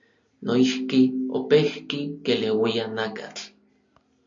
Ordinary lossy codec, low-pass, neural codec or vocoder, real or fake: MP3, 64 kbps; 7.2 kHz; none; real